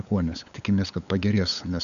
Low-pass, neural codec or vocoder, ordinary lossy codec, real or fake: 7.2 kHz; codec, 16 kHz, 8 kbps, FunCodec, trained on LibriTTS, 25 frames a second; MP3, 96 kbps; fake